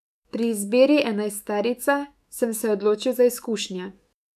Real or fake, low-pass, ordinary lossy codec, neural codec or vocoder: fake; 14.4 kHz; none; autoencoder, 48 kHz, 128 numbers a frame, DAC-VAE, trained on Japanese speech